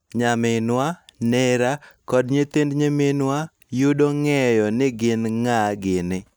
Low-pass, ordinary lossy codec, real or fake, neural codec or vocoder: none; none; real; none